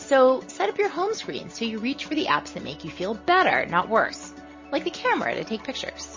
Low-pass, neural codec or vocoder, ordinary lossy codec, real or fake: 7.2 kHz; none; MP3, 32 kbps; real